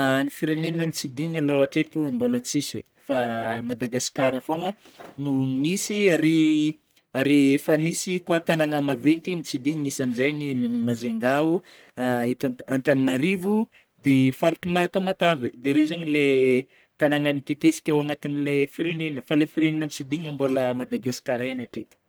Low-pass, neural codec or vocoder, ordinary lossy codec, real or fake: none; codec, 44.1 kHz, 1.7 kbps, Pupu-Codec; none; fake